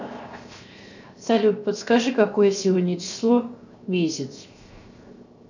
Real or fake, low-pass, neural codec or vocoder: fake; 7.2 kHz; codec, 16 kHz, 0.7 kbps, FocalCodec